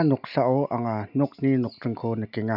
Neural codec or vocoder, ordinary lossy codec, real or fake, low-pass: none; none; real; 5.4 kHz